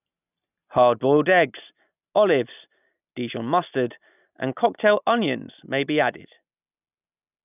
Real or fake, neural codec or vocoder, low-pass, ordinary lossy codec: real; none; 3.6 kHz; none